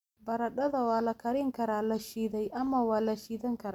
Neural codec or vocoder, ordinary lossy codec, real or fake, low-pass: none; none; real; 19.8 kHz